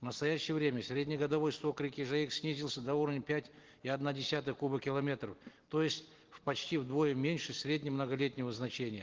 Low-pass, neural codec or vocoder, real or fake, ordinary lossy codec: 7.2 kHz; none; real; Opus, 16 kbps